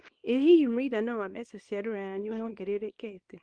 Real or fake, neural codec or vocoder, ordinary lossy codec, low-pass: fake; codec, 24 kHz, 0.9 kbps, WavTokenizer, small release; Opus, 24 kbps; 9.9 kHz